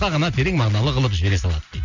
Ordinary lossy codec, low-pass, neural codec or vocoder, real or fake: none; 7.2 kHz; none; real